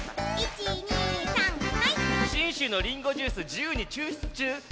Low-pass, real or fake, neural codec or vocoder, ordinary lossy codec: none; real; none; none